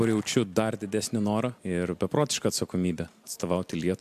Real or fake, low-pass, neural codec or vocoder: real; 14.4 kHz; none